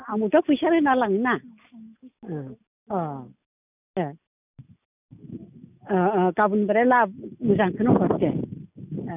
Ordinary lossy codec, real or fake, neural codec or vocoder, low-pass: none; real; none; 3.6 kHz